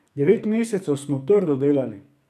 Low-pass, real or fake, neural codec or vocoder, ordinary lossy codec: 14.4 kHz; fake; codec, 44.1 kHz, 2.6 kbps, SNAC; none